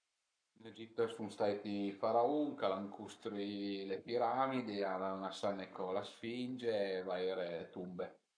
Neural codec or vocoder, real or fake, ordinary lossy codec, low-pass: codec, 44.1 kHz, 7.8 kbps, Pupu-Codec; fake; none; 9.9 kHz